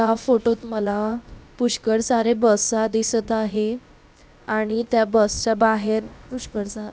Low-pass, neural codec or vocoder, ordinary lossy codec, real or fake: none; codec, 16 kHz, about 1 kbps, DyCAST, with the encoder's durations; none; fake